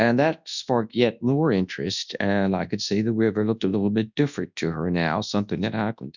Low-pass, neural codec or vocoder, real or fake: 7.2 kHz; codec, 24 kHz, 0.9 kbps, WavTokenizer, large speech release; fake